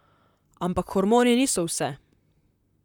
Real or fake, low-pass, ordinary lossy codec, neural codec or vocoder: fake; 19.8 kHz; none; vocoder, 44.1 kHz, 128 mel bands, Pupu-Vocoder